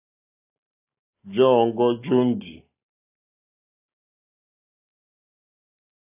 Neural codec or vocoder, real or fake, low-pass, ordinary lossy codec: none; real; 3.6 kHz; AAC, 24 kbps